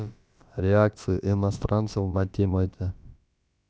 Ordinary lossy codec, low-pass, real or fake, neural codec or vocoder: none; none; fake; codec, 16 kHz, about 1 kbps, DyCAST, with the encoder's durations